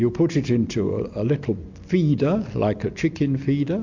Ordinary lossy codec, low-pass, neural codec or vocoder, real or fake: MP3, 48 kbps; 7.2 kHz; none; real